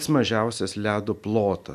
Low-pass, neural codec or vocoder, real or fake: 14.4 kHz; none; real